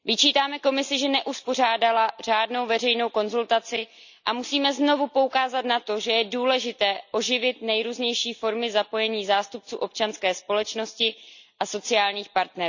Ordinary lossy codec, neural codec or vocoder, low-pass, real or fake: none; none; 7.2 kHz; real